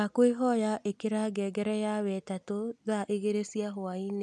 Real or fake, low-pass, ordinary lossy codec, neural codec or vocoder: real; none; none; none